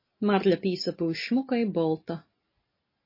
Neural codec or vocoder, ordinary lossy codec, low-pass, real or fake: none; MP3, 24 kbps; 5.4 kHz; real